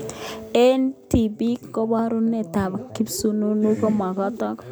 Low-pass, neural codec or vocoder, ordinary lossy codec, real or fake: none; none; none; real